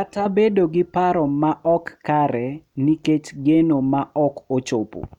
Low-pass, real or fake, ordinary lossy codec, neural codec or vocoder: 19.8 kHz; real; none; none